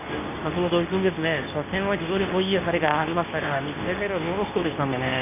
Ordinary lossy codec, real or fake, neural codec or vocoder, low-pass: none; fake; codec, 24 kHz, 0.9 kbps, WavTokenizer, medium speech release version 2; 3.6 kHz